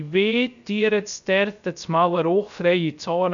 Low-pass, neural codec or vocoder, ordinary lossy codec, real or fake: 7.2 kHz; codec, 16 kHz, 0.3 kbps, FocalCodec; MP3, 96 kbps; fake